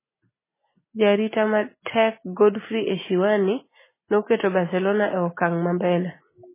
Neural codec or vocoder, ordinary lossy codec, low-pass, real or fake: none; MP3, 16 kbps; 3.6 kHz; real